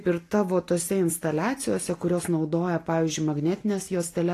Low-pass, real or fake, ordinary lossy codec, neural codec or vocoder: 14.4 kHz; real; AAC, 48 kbps; none